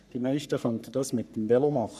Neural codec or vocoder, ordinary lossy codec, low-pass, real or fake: codec, 44.1 kHz, 3.4 kbps, Pupu-Codec; none; 14.4 kHz; fake